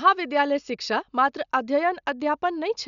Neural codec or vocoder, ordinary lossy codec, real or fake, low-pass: none; none; real; 7.2 kHz